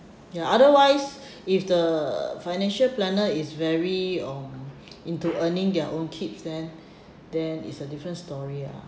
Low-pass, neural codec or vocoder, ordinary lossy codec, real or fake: none; none; none; real